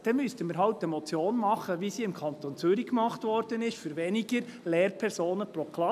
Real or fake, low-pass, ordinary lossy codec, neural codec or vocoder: real; 14.4 kHz; none; none